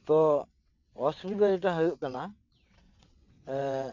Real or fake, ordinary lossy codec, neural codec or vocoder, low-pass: fake; none; vocoder, 22.05 kHz, 80 mel bands, WaveNeXt; 7.2 kHz